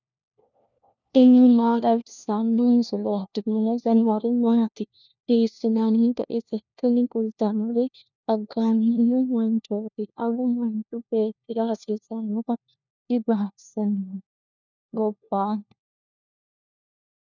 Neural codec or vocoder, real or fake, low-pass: codec, 16 kHz, 1 kbps, FunCodec, trained on LibriTTS, 50 frames a second; fake; 7.2 kHz